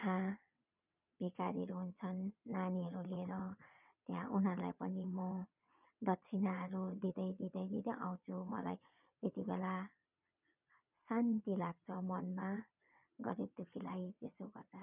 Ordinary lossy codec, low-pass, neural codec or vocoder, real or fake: none; 3.6 kHz; vocoder, 22.05 kHz, 80 mel bands, WaveNeXt; fake